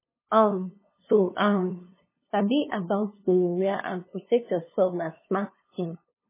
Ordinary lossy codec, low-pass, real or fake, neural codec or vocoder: MP3, 16 kbps; 3.6 kHz; fake; codec, 16 kHz, 2 kbps, FunCodec, trained on LibriTTS, 25 frames a second